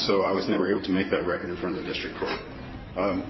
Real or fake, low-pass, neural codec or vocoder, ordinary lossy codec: fake; 7.2 kHz; codec, 16 kHz in and 24 kHz out, 2.2 kbps, FireRedTTS-2 codec; MP3, 24 kbps